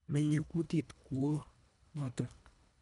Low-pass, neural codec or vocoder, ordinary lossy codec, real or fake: 10.8 kHz; codec, 24 kHz, 1.5 kbps, HILCodec; none; fake